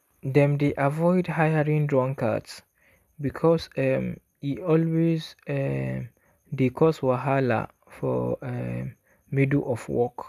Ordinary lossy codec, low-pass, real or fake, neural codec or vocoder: none; 14.4 kHz; real; none